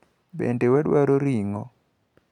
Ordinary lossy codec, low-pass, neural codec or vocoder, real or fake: none; 19.8 kHz; none; real